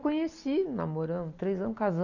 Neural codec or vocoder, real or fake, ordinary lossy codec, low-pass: none; real; none; 7.2 kHz